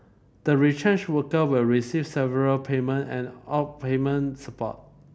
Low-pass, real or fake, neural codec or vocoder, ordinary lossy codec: none; real; none; none